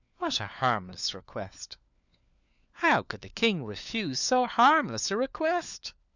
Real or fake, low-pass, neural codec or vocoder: fake; 7.2 kHz; codec, 16 kHz, 4 kbps, FunCodec, trained on LibriTTS, 50 frames a second